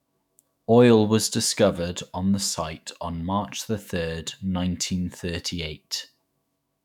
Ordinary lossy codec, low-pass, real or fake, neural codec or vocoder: none; 19.8 kHz; fake; autoencoder, 48 kHz, 128 numbers a frame, DAC-VAE, trained on Japanese speech